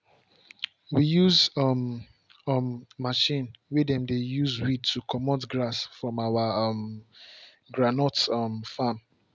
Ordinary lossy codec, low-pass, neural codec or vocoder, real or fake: none; none; none; real